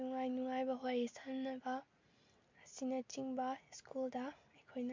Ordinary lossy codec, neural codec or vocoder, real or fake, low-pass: MP3, 48 kbps; none; real; 7.2 kHz